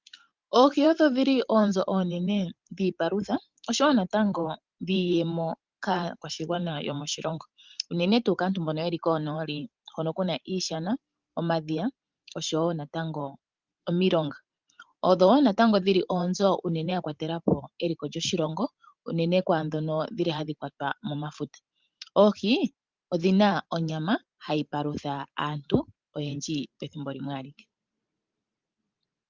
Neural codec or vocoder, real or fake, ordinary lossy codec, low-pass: vocoder, 44.1 kHz, 128 mel bands every 512 samples, BigVGAN v2; fake; Opus, 32 kbps; 7.2 kHz